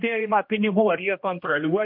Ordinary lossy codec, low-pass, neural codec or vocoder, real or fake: MP3, 48 kbps; 5.4 kHz; codec, 16 kHz, 1 kbps, X-Codec, HuBERT features, trained on general audio; fake